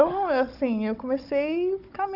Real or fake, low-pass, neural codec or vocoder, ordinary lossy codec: fake; 5.4 kHz; codec, 16 kHz, 16 kbps, FunCodec, trained on Chinese and English, 50 frames a second; none